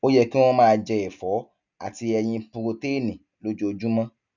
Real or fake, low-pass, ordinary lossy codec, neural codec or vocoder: real; 7.2 kHz; none; none